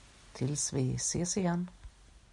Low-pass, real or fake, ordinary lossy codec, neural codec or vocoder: 10.8 kHz; real; MP3, 96 kbps; none